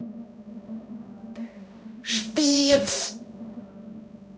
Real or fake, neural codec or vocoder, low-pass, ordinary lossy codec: fake; codec, 16 kHz, 0.5 kbps, X-Codec, HuBERT features, trained on balanced general audio; none; none